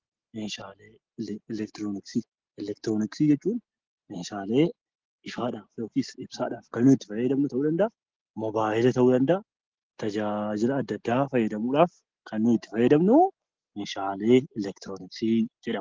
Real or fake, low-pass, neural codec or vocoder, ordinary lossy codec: real; 7.2 kHz; none; Opus, 16 kbps